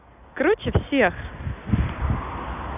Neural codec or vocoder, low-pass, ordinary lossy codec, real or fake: none; 3.6 kHz; none; real